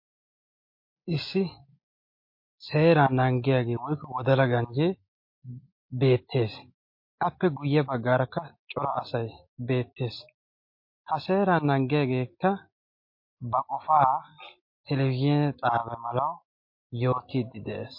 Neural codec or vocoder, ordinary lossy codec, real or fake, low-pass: none; MP3, 32 kbps; real; 5.4 kHz